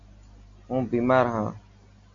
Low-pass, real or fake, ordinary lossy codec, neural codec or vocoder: 7.2 kHz; real; MP3, 48 kbps; none